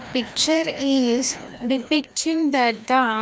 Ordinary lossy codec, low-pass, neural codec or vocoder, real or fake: none; none; codec, 16 kHz, 1 kbps, FreqCodec, larger model; fake